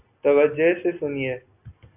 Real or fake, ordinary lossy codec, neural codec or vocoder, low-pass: real; AAC, 32 kbps; none; 3.6 kHz